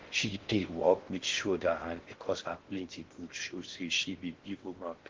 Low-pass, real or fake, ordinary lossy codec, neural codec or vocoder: 7.2 kHz; fake; Opus, 16 kbps; codec, 16 kHz in and 24 kHz out, 0.6 kbps, FocalCodec, streaming, 4096 codes